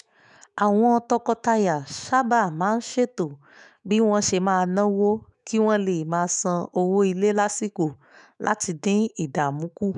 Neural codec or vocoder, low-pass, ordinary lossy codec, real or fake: autoencoder, 48 kHz, 128 numbers a frame, DAC-VAE, trained on Japanese speech; 10.8 kHz; none; fake